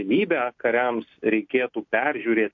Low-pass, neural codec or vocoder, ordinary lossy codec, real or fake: 7.2 kHz; none; MP3, 48 kbps; real